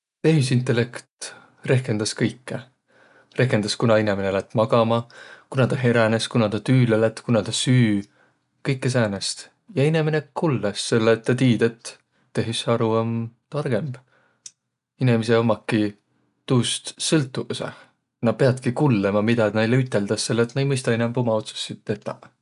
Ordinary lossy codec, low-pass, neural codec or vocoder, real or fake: none; 10.8 kHz; none; real